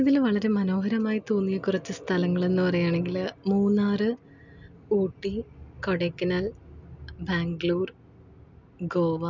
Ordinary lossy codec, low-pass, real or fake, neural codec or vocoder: none; 7.2 kHz; real; none